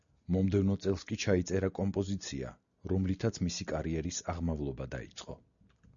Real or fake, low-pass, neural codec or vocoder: real; 7.2 kHz; none